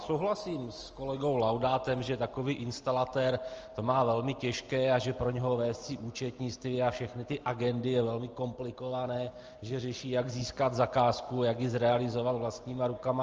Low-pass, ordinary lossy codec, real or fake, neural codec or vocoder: 7.2 kHz; Opus, 24 kbps; real; none